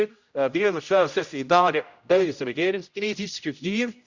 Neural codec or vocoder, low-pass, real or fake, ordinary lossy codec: codec, 16 kHz, 0.5 kbps, X-Codec, HuBERT features, trained on general audio; 7.2 kHz; fake; Opus, 64 kbps